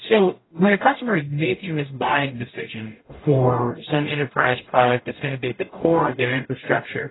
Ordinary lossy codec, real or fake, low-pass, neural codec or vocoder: AAC, 16 kbps; fake; 7.2 kHz; codec, 44.1 kHz, 0.9 kbps, DAC